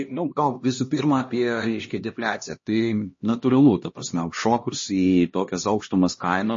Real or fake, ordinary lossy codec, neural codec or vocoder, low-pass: fake; MP3, 32 kbps; codec, 16 kHz, 1 kbps, X-Codec, HuBERT features, trained on LibriSpeech; 7.2 kHz